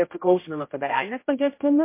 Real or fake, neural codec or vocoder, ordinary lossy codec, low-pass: fake; codec, 16 kHz, 0.5 kbps, X-Codec, HuBERT features, trained on general audio; MP3, 24 kbps; 3.6 kHz